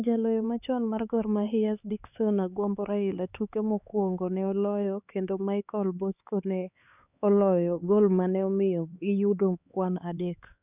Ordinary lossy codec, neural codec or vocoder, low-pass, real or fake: none; codec, 16 kHz, 4 kbps, X-Codec, HuBERT features, trained on LibriSpeech; 3.6 kHz; fake